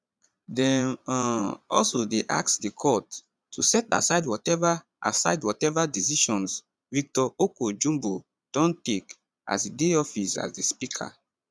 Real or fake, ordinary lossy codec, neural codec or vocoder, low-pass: fake; none; vocoder, 22.05 kHz, 80 mel bands, Vocos; none